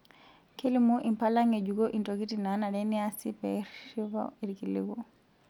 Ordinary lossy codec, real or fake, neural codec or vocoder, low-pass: none; real; none; 19.8 kHz